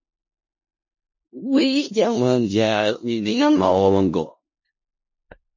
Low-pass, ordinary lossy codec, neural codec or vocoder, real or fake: 7.2 kHz; MP3, 32 kbps; codec, 16 kHz in and 24 kHz out, 0.4 kbps, LongCat-Audio-Codec, four codebook decoder; fake